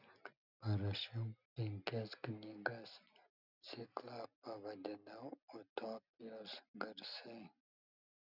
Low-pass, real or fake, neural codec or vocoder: 5.4 kHz; real; none